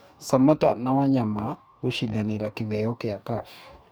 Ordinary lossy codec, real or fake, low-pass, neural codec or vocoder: none; fake; none; codec, 44.1 kHz, 2.6 kbps, DAC